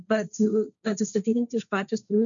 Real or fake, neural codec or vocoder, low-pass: fake; codec, 16 kHz, 1.1 kbps, Voila-Tokenizer; 7.2 kHz